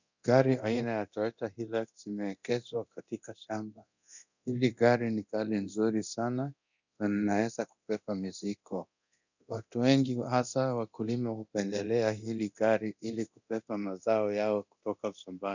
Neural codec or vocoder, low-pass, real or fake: codec, 24 kHz, 0.9 kbps, DualCodec; 7.2 kHz; fake